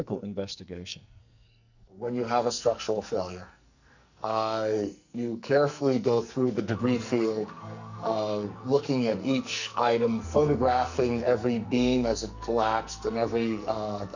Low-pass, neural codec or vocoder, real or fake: 7.2 kHz; codec, 44.1 kHz, 2.6 kbps, SNAC; fake